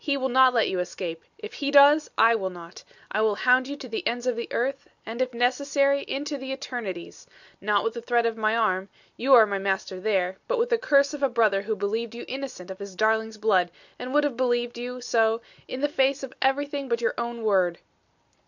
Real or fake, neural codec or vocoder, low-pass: real; none; 7.2 kHz